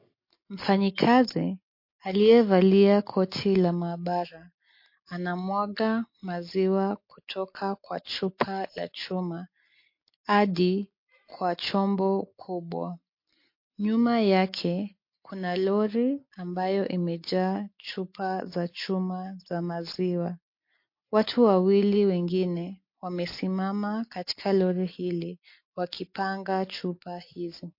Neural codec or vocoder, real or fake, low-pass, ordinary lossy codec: none; real; 5.4 kHz; MP3, 32 kbps